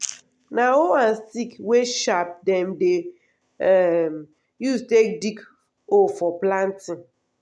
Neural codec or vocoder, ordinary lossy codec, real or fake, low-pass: none; none; real; none